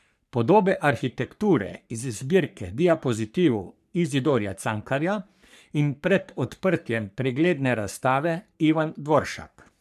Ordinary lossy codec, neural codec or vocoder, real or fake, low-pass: none; codec, 44.1 kHz, 3.4 kbps, Pupu-Codec; fake; 14.4 kHz